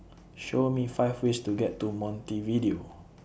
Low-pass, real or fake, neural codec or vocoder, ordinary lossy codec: none; real; none; none